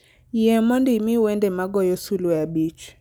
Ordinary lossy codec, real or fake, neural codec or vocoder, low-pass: none; real; none; none